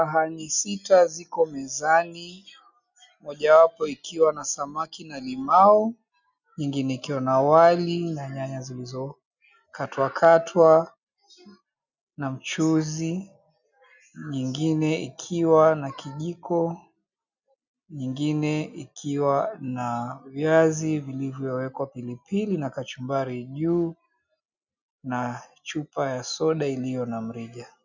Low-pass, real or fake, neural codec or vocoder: 7.2 kHz; real; none